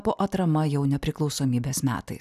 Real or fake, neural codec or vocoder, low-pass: real; none; 14.4 kHz